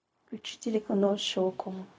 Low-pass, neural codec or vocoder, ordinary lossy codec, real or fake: none; codec, 16 kHz, 0.4 kbps, LongCat-Audio-Codec; none; fake